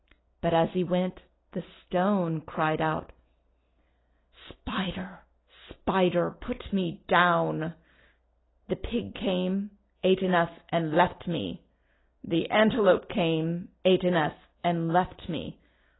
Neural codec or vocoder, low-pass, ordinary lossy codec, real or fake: none; 7.2 kHz; AAC, 16 kbps; real